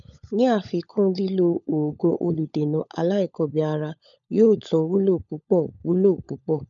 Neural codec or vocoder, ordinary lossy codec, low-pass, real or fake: codec, 16 kHz, 16 kbps, FunCodec, trained on LibriTTS, 50 frames a second; none; 7.2 kHz; fake